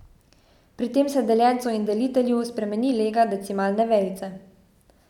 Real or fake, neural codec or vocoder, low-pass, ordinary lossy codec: real; none; 19.8 kHz; none